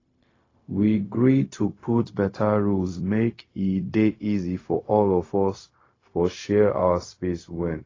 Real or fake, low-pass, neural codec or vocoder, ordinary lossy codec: fake; 7.2 kHz; codec, 16 kHz, 0.4 kbps, LongCat-Audio-Codec; AAC, 32 kbps